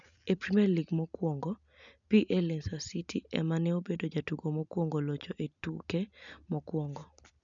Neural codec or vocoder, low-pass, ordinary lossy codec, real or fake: none; 7.2 kHz; none; real